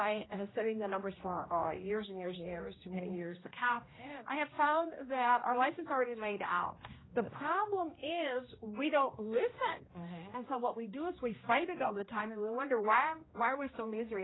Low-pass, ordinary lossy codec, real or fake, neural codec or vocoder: 7.2 kHz; AAC, 16 kbps; fake; codec, 16 kHz, 1 kbps, X-Codec, HuBERT features, trained on general audio